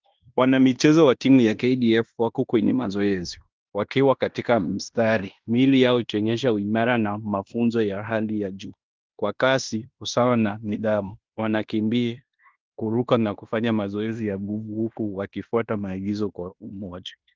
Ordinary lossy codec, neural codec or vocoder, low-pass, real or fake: Opus, 32 kbps; codec, 16 kHz in and 24 kHz out, 0.9 kbps, LongCat-Audio-Codec, fine tuned four codebook decoder; 7.2 kHz; fake